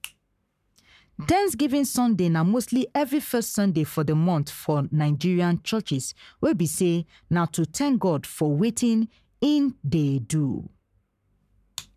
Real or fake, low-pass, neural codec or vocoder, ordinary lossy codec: fake; 14.4 kHz; codec, 44.1 kHz, 7.8 kbps, Pupu-Codec; none